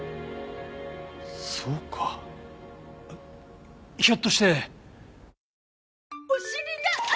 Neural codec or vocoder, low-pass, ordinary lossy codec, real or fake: none; none; none; real